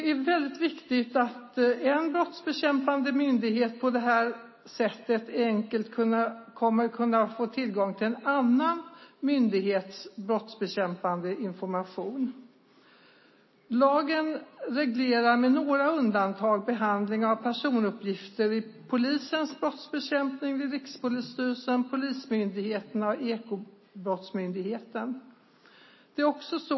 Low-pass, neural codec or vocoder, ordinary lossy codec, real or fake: 7.2 kHz; none; MP3, 24 kbps; real